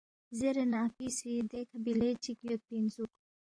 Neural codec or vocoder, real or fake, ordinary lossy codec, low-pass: vocoder, 44.1 kHz, 128 mel bands, Pupu-Vocoder; fake; AAC, 48 kbps; 9.9 kHz